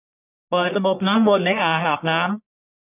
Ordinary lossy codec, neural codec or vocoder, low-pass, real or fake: none; codec, 44.1 kHz, 1.7 kbps, Pupu-Codec; 3.6 kHz; fake